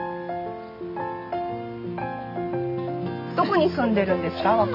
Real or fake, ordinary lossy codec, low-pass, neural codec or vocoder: real; MP3, 48 kbps; 5.4 kHz; none